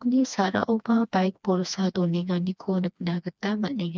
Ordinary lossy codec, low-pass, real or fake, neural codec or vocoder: none; none; fake; codec, 16 kHz, 2 kbps, FreqCodec, smaller model